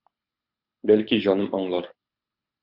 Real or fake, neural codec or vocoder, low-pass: fake; codec, 24 kHz, 6 kbps, HILCodec; 5.4 kHz